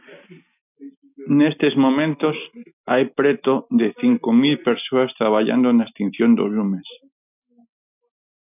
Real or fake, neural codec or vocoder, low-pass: real; none; 3.6 kHz